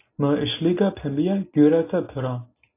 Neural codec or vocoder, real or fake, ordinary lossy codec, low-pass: none; real; AAC, 24 kbps; 3.6 kHz